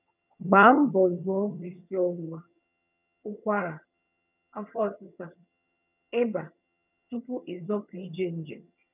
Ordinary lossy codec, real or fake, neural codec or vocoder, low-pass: none; fake; vocoder, 22.05 kHz, 80 mel bands, HiFi-GAN; 3.6 kHz